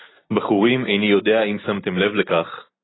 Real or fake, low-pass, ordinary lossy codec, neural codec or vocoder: real; 7.2 kHz; AAC, 16 kbps; none